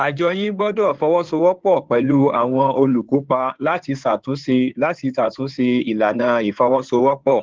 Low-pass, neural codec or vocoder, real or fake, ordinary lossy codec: 7.2 kHz; codec, 16 kHz in and 24 kHz out, 2.2 kbps, FireRedTTS-2 codec; fake; Opus, 16 kbps